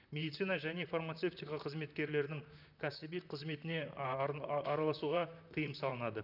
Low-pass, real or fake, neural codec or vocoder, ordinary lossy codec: 5.4 kHz; fake; vocoder, 44.1 kHz, 128 mel bands, Pupu-Vocoder; none